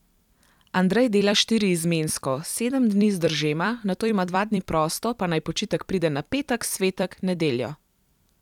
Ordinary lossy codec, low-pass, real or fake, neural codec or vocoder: none; 19.8 kHz; fake; vocoder, 44.1 kHz, 128 mel bands every 256 samples, BigVGAN v2